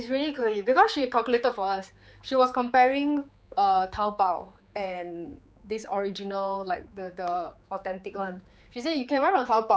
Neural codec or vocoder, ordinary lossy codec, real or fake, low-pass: codec, 16 kHz, 4 kbps, X-Codec, HuBERT features, trained on general audio; none; fake; none